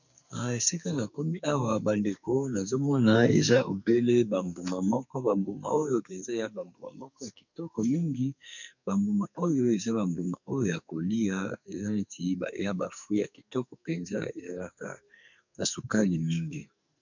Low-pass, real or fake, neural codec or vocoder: 7.2 kHz; fake; codec, 44.1 kHz, 2.6 kbps, SNAC